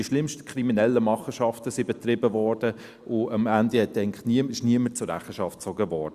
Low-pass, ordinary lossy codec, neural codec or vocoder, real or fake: 14.4 kHz; Opus, 64 kbps; none; real